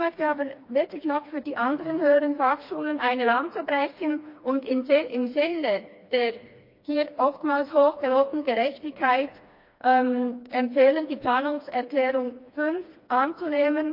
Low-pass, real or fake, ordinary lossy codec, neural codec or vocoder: 5.4 kHz; fake; MP3, 32 kbps; codec, 16 kHz, 2 kbps, FreqCodec, smaller model